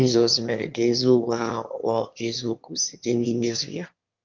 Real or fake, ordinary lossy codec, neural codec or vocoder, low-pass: fake; Opus, 32 kbps; autoencoder, 22.05 kHz, a latent of 192 numbers a frame, VITS, trained on one speaker; 7.2 kHz